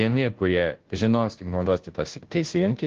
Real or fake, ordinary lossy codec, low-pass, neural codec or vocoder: fake; Opus, 16 kbps; 7.2 kHz; codec, 16 kHz, 0.5 kbps, FunCodec, trained on Chinese and English, 25 frames a second